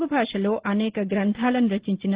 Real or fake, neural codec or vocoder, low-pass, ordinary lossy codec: real; none; 3.6 kHz; Opus, 16 kbps